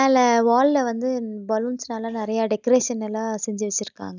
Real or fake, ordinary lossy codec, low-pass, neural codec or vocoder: real; none; 7.2 kHz; none